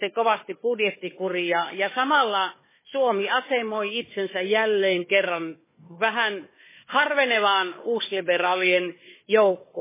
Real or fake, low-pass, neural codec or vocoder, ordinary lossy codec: fake; 3.6 kHz; codec, 16 kHz, 4 kbps, FunCodec, trained on Chinese and English, 50 frames a second; MP3, 16 kbps